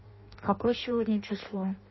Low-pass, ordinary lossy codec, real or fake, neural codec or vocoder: 7.2 kHz; MP3, 24 kbps; fake; codec, 16 kHz in and 24 kHz out, 0.6 kbps, FireRedTTS-2 codec